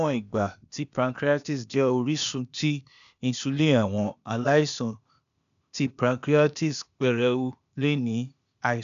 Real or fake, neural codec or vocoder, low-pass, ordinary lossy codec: fake; codec, 16 kHz, 0.8 kbps, ZipCodec; 7.2 kHz; none